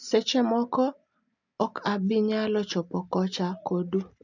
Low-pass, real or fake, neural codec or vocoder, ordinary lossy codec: 7.2 kHz; real; none; none